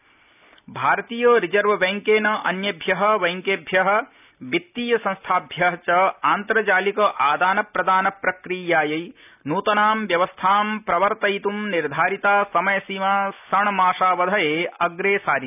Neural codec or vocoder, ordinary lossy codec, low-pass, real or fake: none; none; 3.6 kHz; real